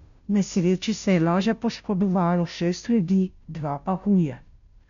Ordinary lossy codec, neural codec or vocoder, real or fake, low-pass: none; codec, 16 kHz, 0.5 kbps, FunCodec, trained on Chinese and English, 25 frames a second; fake; 7.2 kHz